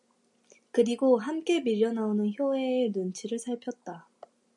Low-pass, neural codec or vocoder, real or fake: 10.8 kHz; none; real